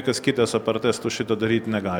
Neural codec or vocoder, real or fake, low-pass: none; real; 19.8 kHz